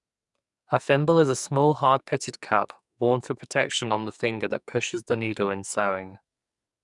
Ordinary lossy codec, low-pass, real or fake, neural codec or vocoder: none; 10.8 kHz; fake; codec, 44.1 kHz, 2.6 kbps, SNAC